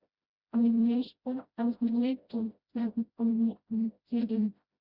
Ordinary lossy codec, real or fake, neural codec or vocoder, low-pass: Opus, 64 kbps; fake; codec, 16 kHz, 0.5 kbps, FreqCodec, smaller model; 5.4 kHz